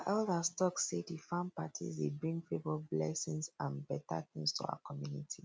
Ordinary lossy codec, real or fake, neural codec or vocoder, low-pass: none; real; none; none